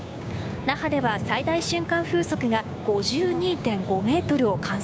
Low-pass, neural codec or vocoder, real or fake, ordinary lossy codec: none; codec, 16 kHz, 6 kbps, DAC; fake; none